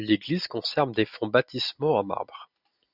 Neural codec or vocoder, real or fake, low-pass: none; real; 5.4 kHz